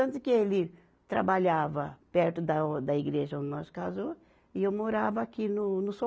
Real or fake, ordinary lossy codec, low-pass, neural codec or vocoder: real; none; none; none